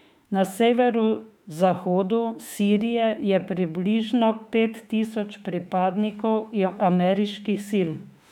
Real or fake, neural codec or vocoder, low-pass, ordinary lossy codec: fake; autoencoder, 48 kHz, 32 numbers a frame, DAC-VAE, trained on Japanese speech; 19.8 kHz; none